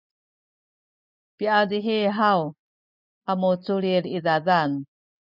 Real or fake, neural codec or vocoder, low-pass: real; none; 5.4 kHz